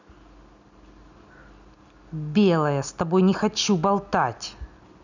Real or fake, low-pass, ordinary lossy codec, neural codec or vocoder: real; 7.2 kHz; none; none